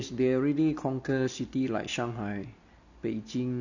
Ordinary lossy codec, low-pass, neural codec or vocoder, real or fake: none; 7.2 kHz; none; real